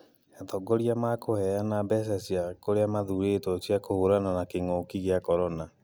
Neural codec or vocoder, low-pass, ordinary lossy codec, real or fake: none; none; none; real